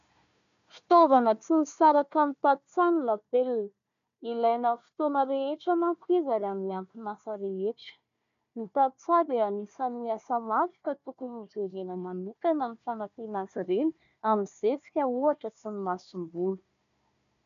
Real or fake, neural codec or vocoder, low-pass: fake; codec, 16 kHz, 1 kbps, FunCodec, trained on Chinese and English, 50 frames a second; 7.2 kHz